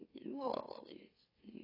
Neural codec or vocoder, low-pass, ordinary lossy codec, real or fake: autoencoder, 44.1 kHz, a latent of 192 numbers a frame, MeloTTS; 5.4 kHz; AAC, 24 kbps; fake